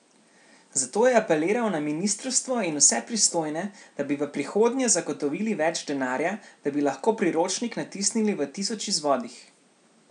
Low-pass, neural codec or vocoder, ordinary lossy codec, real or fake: 9.9 kHz; none; none; real